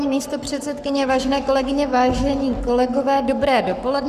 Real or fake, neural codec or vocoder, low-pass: fake; codec, 44.1 kHz, 7.8 kbps, Pupu-Codec; 14.4 kHz